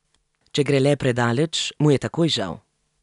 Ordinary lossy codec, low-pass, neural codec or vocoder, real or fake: none; 10.8 kHz; none; real